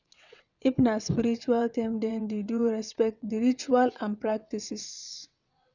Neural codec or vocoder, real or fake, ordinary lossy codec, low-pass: vocoder, 22.05 kHz, 80 mel bands, WaveNeXt; fake; none; 7.2 kHz